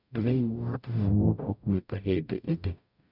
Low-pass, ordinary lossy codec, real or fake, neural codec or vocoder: 5.4 kHz; none; fake; codec, 44.1 kHz, 0.9 kbps, DAC